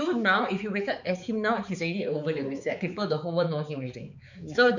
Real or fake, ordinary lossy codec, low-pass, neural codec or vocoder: fake; none; 7.2 kHz; codec, 16 kHz, 4 kbps, X-Codec, HuBERT features, trained on balanced general audio